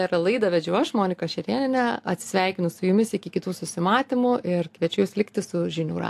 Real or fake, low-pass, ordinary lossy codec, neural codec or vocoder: real; 14.4 kHz; AAC, 64 kbps; none